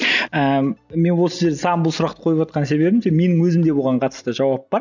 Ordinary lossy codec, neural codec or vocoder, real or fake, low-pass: none; none; real; 7.2 kHz